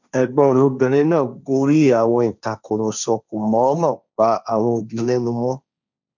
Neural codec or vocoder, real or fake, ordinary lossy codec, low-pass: codec, 16 kHz, 1.1 kbps, Voila-Tokenizer; fake; none; 7.2 kHz